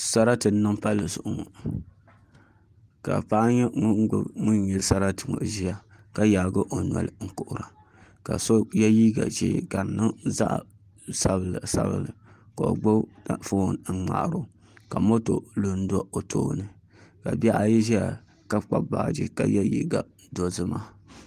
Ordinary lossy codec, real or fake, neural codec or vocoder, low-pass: Opus, 24 kbps; real; none; 14.4 kHz